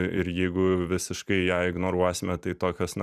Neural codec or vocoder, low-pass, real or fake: vocoder, 44.1 kHz, 128 mel bands every 256 samples, BigVGAN v2; 14.4 kHz; fake